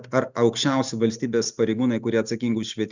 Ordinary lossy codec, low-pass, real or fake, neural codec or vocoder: Opus, 64 kbps; 7.2 kHz; real; none